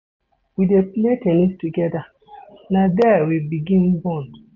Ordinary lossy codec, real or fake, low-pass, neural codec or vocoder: none; real; 7.2 kHz; none